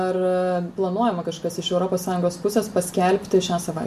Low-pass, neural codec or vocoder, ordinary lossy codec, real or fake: 14.4 kHz; none; MP3, 96 kbps; real